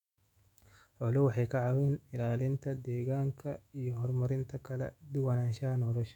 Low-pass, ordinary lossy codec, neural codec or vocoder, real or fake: 19.8 kHz; none; autoencoder, 48 kHz, 128 numbers a frame, DAC-VAE, trained on Japanese speech; fake